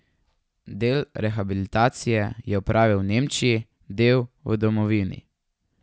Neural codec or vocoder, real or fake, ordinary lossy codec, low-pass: none; real; none; none